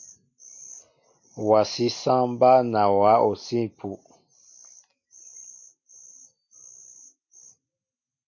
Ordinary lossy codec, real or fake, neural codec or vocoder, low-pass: MP3, 48 kbps; real; none; 7.2 kHz